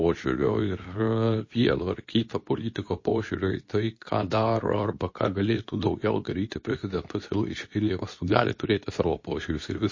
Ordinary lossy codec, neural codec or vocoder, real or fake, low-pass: MP3, 32 kbps; codec, 24 kHz, 0.9 kbps, WavTokenizer, medium speech release version 2; fake; 7.2 kHz